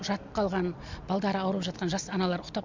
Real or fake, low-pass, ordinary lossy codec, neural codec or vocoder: real; 7.2 kHz; MP3, 64 kbps; none